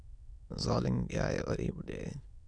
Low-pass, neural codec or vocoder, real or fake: 9.9 kHz; autoencoder, 22.05 kHz, a latent of 192 numbers a frame, VITS, trained on many speakers; fake